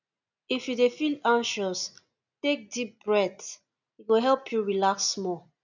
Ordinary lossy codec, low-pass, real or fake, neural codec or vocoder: none; 7.2 kHz; real; none